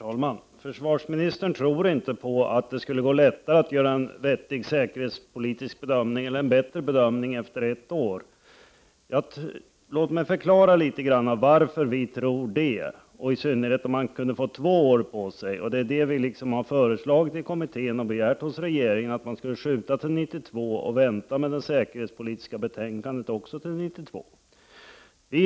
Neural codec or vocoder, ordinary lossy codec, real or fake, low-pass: none; none; real; none